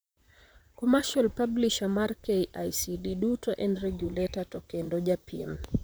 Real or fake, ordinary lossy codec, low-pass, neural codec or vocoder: fake; none; none; vocoder, 44.1 kHz, 128 mel bands, Pupu-Vocoder